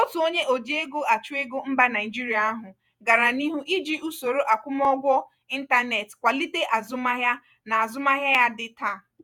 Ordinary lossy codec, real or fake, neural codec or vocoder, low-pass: none; fake; vocoder, 48 kHz, 128 mel bands, Vocos; 19.8 kHz